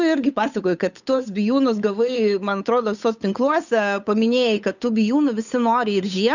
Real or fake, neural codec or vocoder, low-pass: fake; codec, 16 kHz, 8 kbps, FunCodec, trained on Chinese and English, 25 frames a second; 7.2 kHz